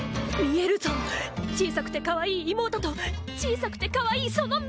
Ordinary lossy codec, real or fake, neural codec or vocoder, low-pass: none; real; none; none